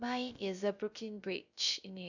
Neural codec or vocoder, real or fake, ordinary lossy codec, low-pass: codec, 16 kHz, 0.3 kbps, FocalCodec; fake; none; 7.2 kHz